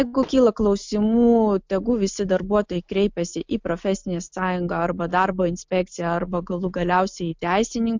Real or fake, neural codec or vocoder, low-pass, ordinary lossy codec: real; none; 7.2 kHz; MP3, 64 kbps